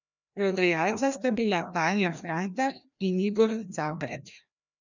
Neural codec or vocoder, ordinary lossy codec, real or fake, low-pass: codec, 16 kHz, 1 kbps, FreqCodec, larger model; none; fake; 7.2 kHz